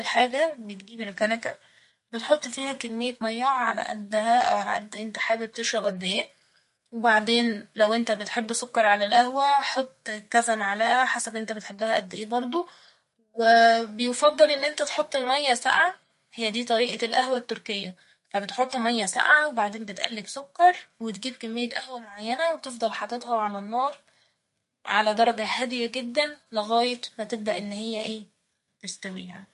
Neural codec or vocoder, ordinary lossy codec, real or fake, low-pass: codec, 32 kHz, 1.9 kbps, SNAC; MP3, 48 kbps; fake; 14.4 kHz